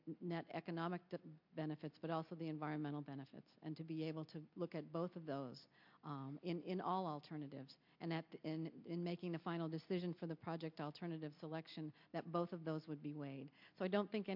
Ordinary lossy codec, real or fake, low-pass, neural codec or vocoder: MP3, 48 kbps; real; 5.4 kHz; none